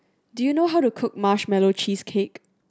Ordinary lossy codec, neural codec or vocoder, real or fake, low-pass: none; none; real; none